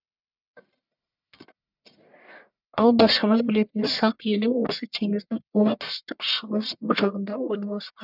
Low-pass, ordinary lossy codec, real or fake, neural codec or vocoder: 5.4 kHz; none; fake; codec, 44.1 kHz, 1.7 kbps, Pupu-Codec